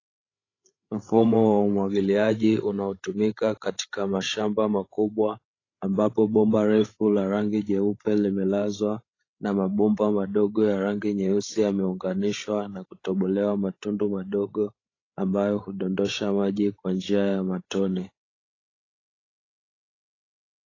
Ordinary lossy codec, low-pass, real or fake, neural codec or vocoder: AAC, 32 kbps; 7.2 kHz; fake; codec, 16 kHz, 16 kbps, FreqCodec, larger model